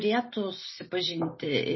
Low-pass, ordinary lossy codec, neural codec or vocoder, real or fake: 7.2 kHz; MP3, 24 kbps; none; real